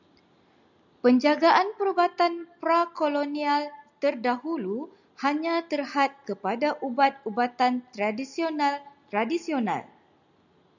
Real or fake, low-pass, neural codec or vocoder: real; 7.2 kHz; none